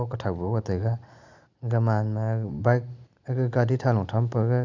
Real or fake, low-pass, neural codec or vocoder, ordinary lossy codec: real; 7.2 kHz; none; none